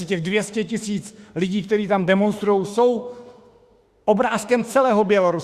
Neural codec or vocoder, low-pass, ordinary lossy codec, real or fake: autoencoder, 48 kHz, 32 numbers a frame, DAC-VAE, trained on Japanese speech; 14.4 kHz; Opus, 64 kbps; fake